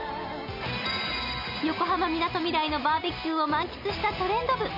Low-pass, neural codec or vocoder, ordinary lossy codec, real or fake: 5.4 kHz; none; none; real